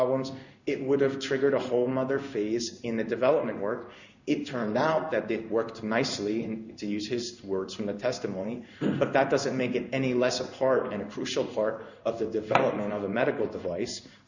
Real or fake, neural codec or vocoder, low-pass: fake; codec, 16 kHz in and 24 kHz out, 1 kbps, XY-Tokenizer; 7.2 kHz